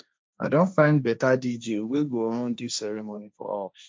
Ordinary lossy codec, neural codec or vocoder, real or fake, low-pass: none; codec, 16 kHz, 1.1 kbps, Voila-Tokenizer; fake; none